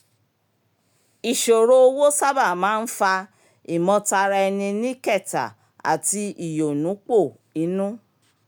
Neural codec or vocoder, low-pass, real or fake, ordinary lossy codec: none; none; real; none